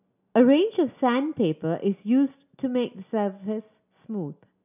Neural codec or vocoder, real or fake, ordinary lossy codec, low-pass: none; real; none; 3.6 kHz